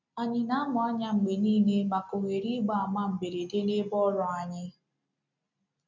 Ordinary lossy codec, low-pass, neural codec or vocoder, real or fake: none; 7.2 kHz; none; real